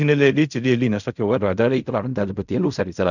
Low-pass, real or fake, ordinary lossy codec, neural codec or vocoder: 7.2 kHz; fake; none; codec, 16 kHz in and 24 kHz out, 0.4 kbps, LongCat-Audio-Codec, fine tuned four codebook decoder